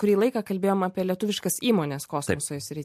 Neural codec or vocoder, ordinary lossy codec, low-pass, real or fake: none; MP3, 64 kbps; 14.4 kHz; real